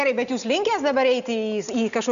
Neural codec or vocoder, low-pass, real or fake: none; 7.2 kHz; real